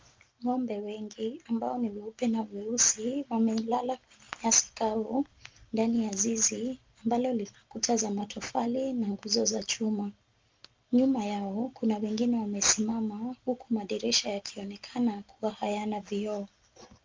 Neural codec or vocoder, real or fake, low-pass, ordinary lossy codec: none; real; 7.2 kHz; Opus, 24 kbps